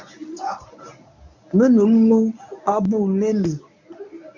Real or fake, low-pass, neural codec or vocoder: fake; 7.2 kHz; codec, 24 kHz, 0.9 kbps, WavTokenizer, medium speech release version 1